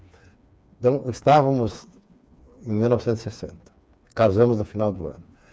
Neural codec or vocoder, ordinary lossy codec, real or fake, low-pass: codec, 16 kHz, 4 kbps, FreqCodec, smaller model; none; fake; none